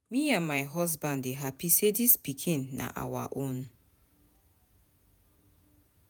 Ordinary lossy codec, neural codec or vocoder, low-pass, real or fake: none; vocoder, 48 kHz, 128 mel bands, Vocos; none; fake